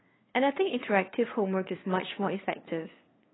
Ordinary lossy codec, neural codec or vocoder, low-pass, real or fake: AAC, 16 kbps; codec, 16 kHz, 2 kbps, FunCodec, trained on LibriTTS, 25 frames a second; 7.2 kHz; fake